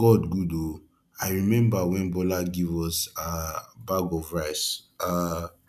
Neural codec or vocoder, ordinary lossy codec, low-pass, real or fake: none; none; 14.4 kHz; real